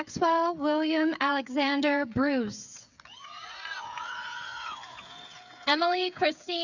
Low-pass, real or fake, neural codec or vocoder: 7.2 kHz; fake; codec, 16 kHz, 8 kbps, FreqCodec, smaller model